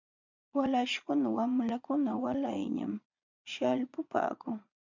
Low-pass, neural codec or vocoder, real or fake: 7.2 kHz; vocoder, 22.05 kHz, 80 mel bands, Vocos; fake